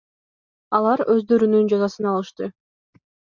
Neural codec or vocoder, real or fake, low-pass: none; real; 7.2 kHz